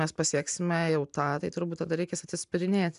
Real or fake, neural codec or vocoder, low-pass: real; none; 10.8 kHz